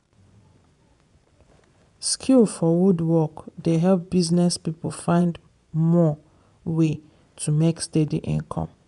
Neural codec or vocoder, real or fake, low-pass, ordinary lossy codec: vocoder, 24 kHz, 100 mel bands, Vocos; fake; 10.8 kHz; none